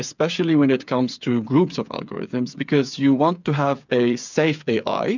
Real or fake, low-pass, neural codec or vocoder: fake; 7.2 kHz; codec, 16 kHz, 8 kbps, FreqCodec, smaller model